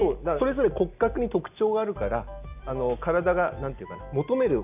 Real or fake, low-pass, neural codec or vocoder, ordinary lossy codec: real; 3.6 kHz; none; none